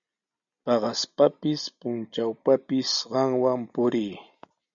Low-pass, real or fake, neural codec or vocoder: 7.2 kHz; real; none